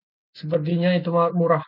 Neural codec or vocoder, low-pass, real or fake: none; 5.4 kHz; real